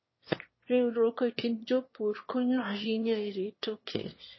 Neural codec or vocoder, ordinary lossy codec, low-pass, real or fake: autoencoder, 22.05 kHz, a latent of 192 numbers a frame, VITS, trained on one speaker; MP3, 24 kbps; 7.2 kHz; fake